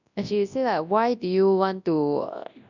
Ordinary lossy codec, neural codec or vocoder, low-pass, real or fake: none; codec, 24 kHz, 0.9 kbps, WavTokenizer, large speech release; 7.2 kHz; fake